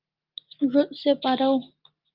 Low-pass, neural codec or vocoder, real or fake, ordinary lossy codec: 5.4 kHz; none; real; Opus, 32 kbps